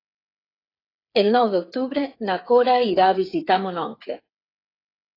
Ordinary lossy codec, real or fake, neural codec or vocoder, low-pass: AAC, 24 kbps; fake; codec, 16 kHz, 8 kbps, FreqCodec, smaller model; 5.4 kHz